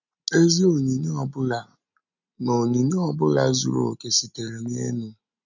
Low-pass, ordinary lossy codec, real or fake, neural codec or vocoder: 7.2 kHz; none; real; none